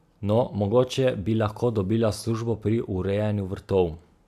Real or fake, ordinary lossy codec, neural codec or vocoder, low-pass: real; AAC, 96 kbps; none; 14.4 kHz